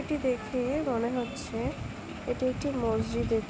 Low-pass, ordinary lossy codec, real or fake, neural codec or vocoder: none; none; real; none